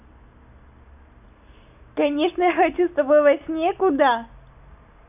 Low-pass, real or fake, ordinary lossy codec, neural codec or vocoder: 3.6 kHz; real; none; none